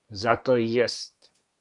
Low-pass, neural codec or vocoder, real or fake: 10.8 kHz; codec, 24 kHz, 1 kbps, SNAC; fake